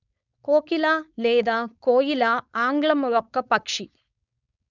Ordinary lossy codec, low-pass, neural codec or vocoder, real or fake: none; 7.2 kHz; codec, 16 kHz, 4.8 kbps, FACodec; fake